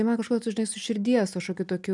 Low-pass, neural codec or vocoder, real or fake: 10.8 kHz; none; real